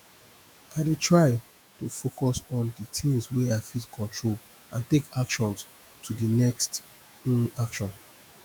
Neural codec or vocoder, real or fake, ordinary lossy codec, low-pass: autoencoder, 48 kHz, 128 numbers a frame, DAC-VAE, trained on Japanese speech; fake; none; none